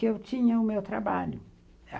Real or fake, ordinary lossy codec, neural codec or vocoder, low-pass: real; none; none; none